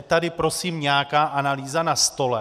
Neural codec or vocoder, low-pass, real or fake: none; 14.4 kHz; real